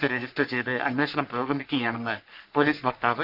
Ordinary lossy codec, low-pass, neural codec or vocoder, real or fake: none; 5.4 kHz; codec, 44.1 kHz, 3.4 kbps, Pupu-Codec; fake